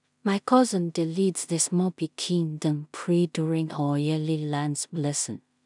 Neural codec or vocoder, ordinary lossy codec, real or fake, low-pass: codec, 16 kHz in and 24 kHz out, 0.4 kbps, LongCat-Audio-Codec, two codebook decoder; none; fake; 10.8 kHz